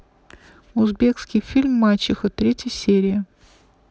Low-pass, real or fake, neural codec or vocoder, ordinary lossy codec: none; real; none; none